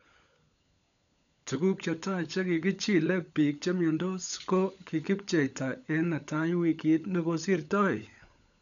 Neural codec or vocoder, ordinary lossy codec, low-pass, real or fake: codec, 16 kHz, 16 kbps, FunCodec, trained on LibriTTS, 50 frames a second; none; 7.2 kHz; fake